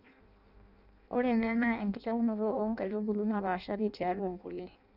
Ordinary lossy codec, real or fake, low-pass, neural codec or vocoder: none; fake; 5.4 kHz; codec, 16 kHz in and 24 kHz out, 0.6 kbps, FireRedTTS-2 codec